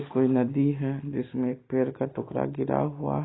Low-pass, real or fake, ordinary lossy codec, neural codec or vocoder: 7.2 kHz; real; AAC, 16 kbps; none